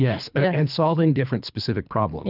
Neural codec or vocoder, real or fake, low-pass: codec, 24 kHz, 3 kbps, HILCodec; fake; 5.4 kHz